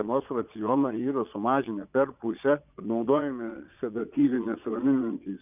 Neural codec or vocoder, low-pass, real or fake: vocoder, 22.05 kHz, 80 mel bands, Vocos; 3.6 kHz; fake